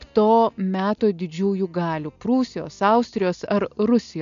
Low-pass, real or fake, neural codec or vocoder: 7.2 kHz; real; none